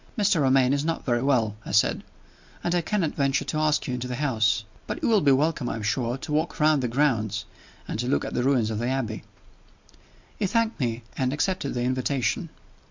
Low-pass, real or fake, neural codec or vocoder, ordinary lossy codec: 7.2 kHz; real; none; MP3, 64 kbps